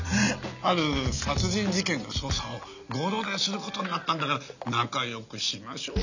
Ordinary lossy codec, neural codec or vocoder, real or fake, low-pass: none; none; real; 7.2 kHz